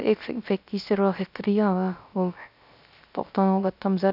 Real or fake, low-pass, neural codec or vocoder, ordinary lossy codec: fake; 5.4 kHz; codec, 16 kHz, 0.3 kbps, FocalCodec; none